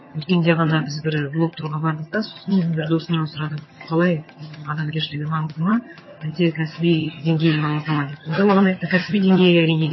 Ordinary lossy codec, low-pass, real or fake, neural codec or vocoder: MP3, 24 kbps; 7.2 kHz; fake; vocoder, 22.05 kHz, 80 mel bands, HiFi-GAN